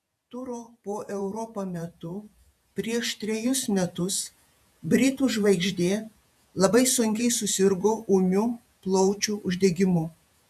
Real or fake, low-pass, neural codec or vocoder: fake; 14.4 kHz; vocoder, 48 kHz, 128 mel bands, Vocos